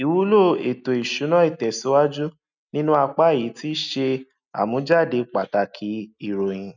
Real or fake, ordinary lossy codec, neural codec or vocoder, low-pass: real; none; none; 7.2 kHz